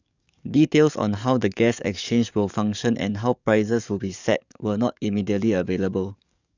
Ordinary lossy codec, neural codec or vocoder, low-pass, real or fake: none; codec, 44.1 kHz, 7.8 kbps, DAC; 7.2 kHz; fake